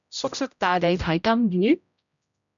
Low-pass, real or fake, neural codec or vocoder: 7.2 kHz; fake; codec, 16 kHz, 0.5 kbps, X-Codec, HuBERT features, trained on general audio